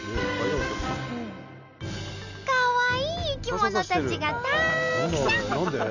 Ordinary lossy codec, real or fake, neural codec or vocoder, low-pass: none; real; none; 7.2 kHz